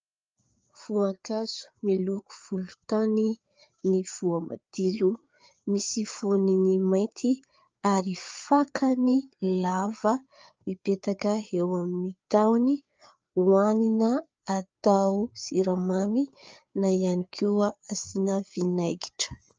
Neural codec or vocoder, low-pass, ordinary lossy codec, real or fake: codec, 16 kHz, 4 kbps, FreqCodec, larger model; 7.2 kHz; Opus, 24 kbps; fake